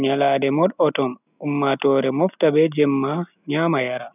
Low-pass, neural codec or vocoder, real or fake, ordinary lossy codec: 3.6 kHz; none; real; none